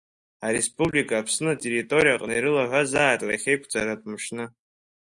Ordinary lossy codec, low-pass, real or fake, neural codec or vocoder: Opus, 64 kbps; 10.8 kHz; real; none